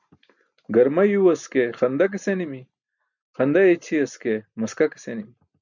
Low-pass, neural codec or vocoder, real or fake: 7.2 kHz; none; real